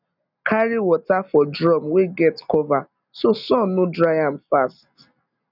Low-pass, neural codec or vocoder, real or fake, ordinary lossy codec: 5.4 kHz; none; real; none